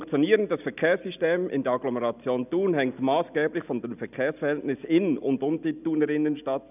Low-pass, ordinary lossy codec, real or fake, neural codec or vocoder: 3.6 kHz; none; real; none